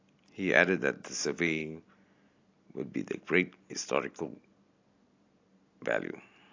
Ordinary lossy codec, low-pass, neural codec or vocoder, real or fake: AAC, 48 kbps; 7.2 kHz; none; real